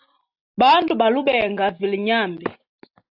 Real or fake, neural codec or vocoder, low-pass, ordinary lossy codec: real; none; 5.4 kHz; AAC, 48 kbps